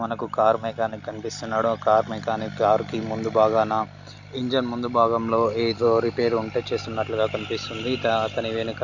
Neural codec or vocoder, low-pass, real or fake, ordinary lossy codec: none; 7.2 kHz; real; none